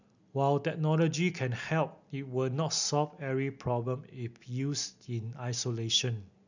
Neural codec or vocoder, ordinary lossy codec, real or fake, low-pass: none; none; real; 7.2 kHz